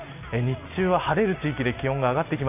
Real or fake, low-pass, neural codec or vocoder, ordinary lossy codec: real; 3.6 kHz; none; none